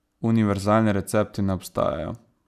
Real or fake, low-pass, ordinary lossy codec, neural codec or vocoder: real; 14.4 kHz; none; none